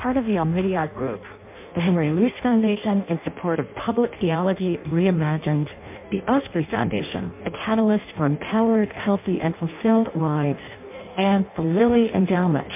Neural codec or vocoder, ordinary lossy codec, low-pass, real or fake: codec, 16 kHz in and 24 kHz out, 0.6 kbps, FireRedTTS-2 codec; MP3, 32 kbps; 3.6 kHz; fake